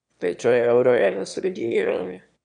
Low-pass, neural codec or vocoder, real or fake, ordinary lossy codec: 9.9 kHz; autoencoder, 22.05 kHz, a latent of 192 numbers a frame, VITS, trained on one speaker; fake; none